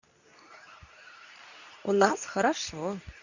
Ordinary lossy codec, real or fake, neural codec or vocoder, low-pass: none; fake; codec, 24 kHz, 0.9 kbps, WavTokenizer, medium speech release version 2; 7.2 kHz